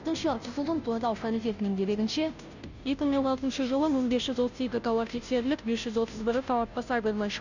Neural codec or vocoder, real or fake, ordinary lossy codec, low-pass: codec, 16 kHz, 0.5 kbps, FunCodec, trained on Chinese and English, 25 frames a second; fake; none; 7.2 kHz